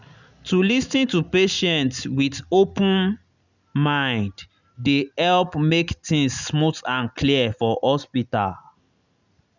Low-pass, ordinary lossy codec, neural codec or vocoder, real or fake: 7.2 kHz; none; none; real